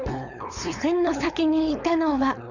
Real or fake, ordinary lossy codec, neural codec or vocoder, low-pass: fake; none; codec, 16 kHz, 4.8 kbps, FACodec; 7.2 kHz